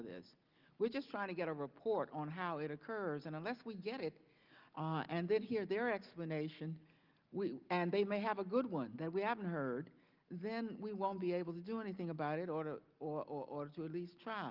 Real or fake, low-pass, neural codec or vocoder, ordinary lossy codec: real; 5.4 kHz; none; Opus, 24 kbps